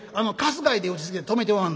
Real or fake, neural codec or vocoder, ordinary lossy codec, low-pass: real; none; none; none